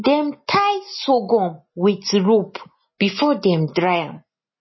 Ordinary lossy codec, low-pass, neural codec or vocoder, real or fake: MP3, 24 kbps; 7.2 kHz; none; real